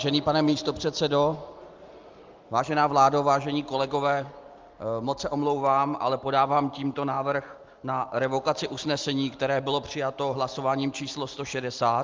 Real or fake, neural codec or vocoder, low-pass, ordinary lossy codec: real; none; 7.2 kHz; Opus, 32 kbps